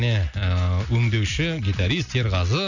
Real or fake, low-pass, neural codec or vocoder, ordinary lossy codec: real; 7.2 kHz; none; none